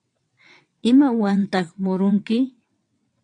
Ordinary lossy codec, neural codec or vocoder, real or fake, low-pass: AAC, 64 kbps; vocoder, 22.05 kHz, 80 mel bands, WaveNeXt; fake; 9.9 kHz